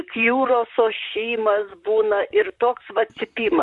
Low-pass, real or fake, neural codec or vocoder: 10.8 kHz; fake; vocoder, 44.1 kHz, 128 mel bands, Pupu-Vocoder